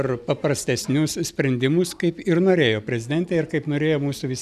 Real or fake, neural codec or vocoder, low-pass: real; none; 14.4 kHz